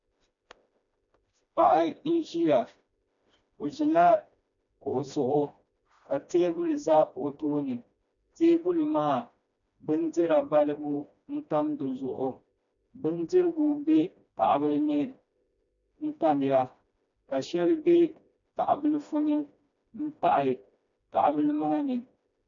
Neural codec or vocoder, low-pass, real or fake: codec, 16 kHz, 1 kbps, FreqCodec, smaller model; 7.2 kHz; fake